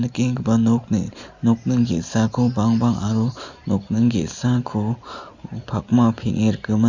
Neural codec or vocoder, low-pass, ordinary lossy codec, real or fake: none; 7.2 kHz; Opus, 64 kbps; real